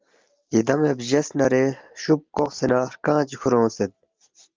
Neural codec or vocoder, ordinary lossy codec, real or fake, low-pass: none; Opus, 32 kbps; real; 7.2 kHz